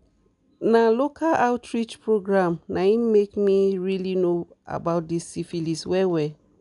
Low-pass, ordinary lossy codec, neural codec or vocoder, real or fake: 10.8 kHz; none; none; real